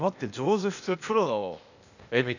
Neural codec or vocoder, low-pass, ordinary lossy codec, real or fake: codec, 16 kHz, 0.8 kbps, ZipCodec; 7.2 kHz; none; fake